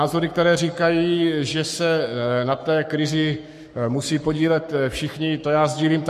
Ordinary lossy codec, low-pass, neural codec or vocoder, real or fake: MP3, 64 kbps; 14.4 kHz; codec, 44.1 kHz, 7.8 kbps, Pupu-Codec; fake